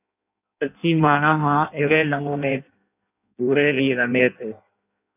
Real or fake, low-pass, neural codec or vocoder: fake; 3.6 kHz; codec, 16 kHz in and 24 kHz out, 0.6 kbps, FireRedTTS-2 codec